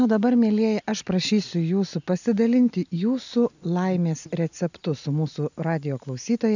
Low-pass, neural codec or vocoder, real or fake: 7.2 kHz; none; real